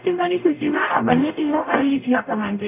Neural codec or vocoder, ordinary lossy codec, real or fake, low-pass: codec, 44.1 kHz, 0.9 kbps, DAC; none; fake; 3.6 kHz